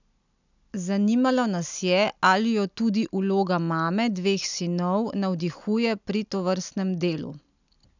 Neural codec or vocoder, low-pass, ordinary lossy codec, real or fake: none; 7.2 kHz; none; real